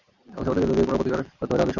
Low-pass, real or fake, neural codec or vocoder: 7.2 kHz; real; none